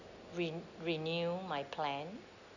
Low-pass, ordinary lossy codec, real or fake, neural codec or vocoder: 7.2 kHz; none; real; none